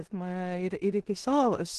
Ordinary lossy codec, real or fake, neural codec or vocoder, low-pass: Opus, 16 kbps; fake; codec, 16 kHz in and 24 kHz out, 0.9 kbps, LongCat-Audio-Codec, four codebook decoder; 10.8 kHz